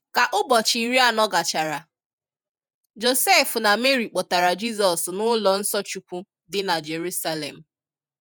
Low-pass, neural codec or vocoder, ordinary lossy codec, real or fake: none; vocoder, 48 kHz, 128 mel bands, Vocos; none; fake